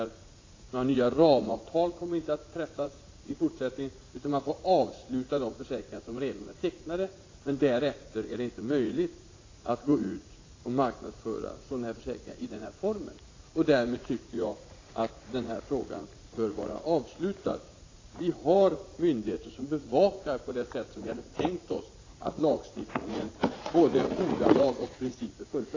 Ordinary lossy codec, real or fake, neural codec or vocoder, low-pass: AAC, 32 kbps; fake; vocoder, 44.1 kHz, 80 mel bands, Vocos; 7.2 kHz